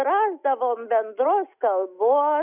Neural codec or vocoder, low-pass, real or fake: none; 3.6 kHz; real